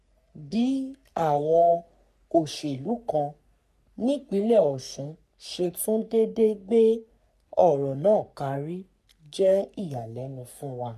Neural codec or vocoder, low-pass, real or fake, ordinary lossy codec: codec, 44.1 kHz, 3.4 kbps, Pupu-Codec; 14.4 kHz; fake; none